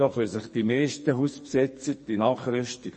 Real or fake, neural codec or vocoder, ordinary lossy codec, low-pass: fake; codec, 44.1 kHz, 2.6 kbps, SNAC; MP3, 32 kbps; 9.9 kHz